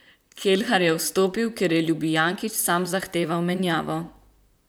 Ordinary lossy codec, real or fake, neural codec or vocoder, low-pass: none; fake; vocoder, 44.1 kHz, 128 mel bands, Pupu-Vocoder; none